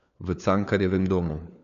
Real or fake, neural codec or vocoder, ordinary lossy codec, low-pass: fake; codec, 16 kHz, 4 kbps, FunCodec, trained on LibriTTS, 50 frames a second; none; 7.2 kHz